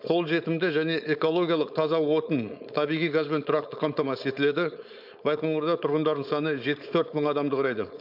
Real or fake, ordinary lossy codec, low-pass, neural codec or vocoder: fake; none; 5.4 kHz; codec, 16 kHz, 4.8 kbps, FACodec